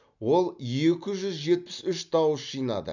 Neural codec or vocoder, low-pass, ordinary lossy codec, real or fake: none; 7.2 kHz; none; real